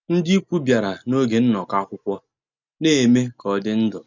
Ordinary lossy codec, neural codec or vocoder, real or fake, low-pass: none; none; real; 7.2 kHz